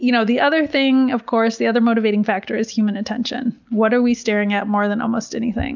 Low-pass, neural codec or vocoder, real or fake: 7.2 kHz; none; real